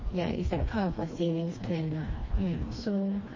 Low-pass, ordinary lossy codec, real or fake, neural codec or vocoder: 7.2 kHz; MP3, 32 kbps; fake; codec, 16 kHz, 2 kbps, FreqCodec, smaller model